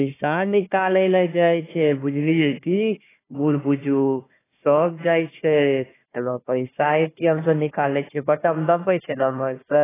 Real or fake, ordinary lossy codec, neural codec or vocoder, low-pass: fake; AAC, 16 kbps; codec, 16 kHz, 1 kbps, FunCodec, trained on Chinese and English, 50 frames a second; 3.6 kHz